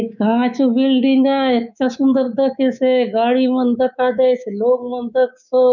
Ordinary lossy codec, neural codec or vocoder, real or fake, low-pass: none; codec, 24 kHz, 3.1 kbps, DualCodec; fake; 7.2 kHz